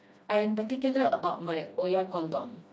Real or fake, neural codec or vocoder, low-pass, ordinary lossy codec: fake; codec, 16 kHz, 1 kbps, FreqCodec, smaller model; none; none